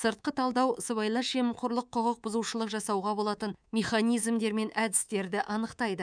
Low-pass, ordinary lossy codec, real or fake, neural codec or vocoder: 9.9 kHz; none; fake; codec, 24 kHz, 3.1 kbps, DualCodec